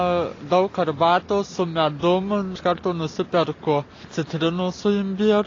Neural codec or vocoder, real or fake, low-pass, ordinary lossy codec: none; real; 7.2 kHz; AAC, 32 kbps